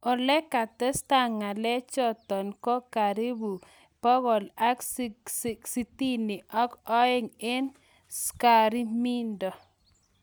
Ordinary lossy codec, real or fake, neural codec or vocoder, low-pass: none; real; none; none